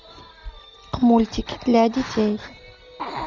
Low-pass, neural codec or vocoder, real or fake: 7.2 kHz; none; real